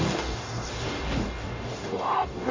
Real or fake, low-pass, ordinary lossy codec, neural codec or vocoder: fake; 7.2 kHz; AAC, 32 kbps; codec, 44.1 kHz, 0.9 kbps, DAC